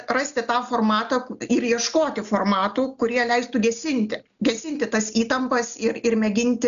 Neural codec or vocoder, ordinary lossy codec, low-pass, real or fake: none; MP3, 96 kbps; 7.2 kHz; real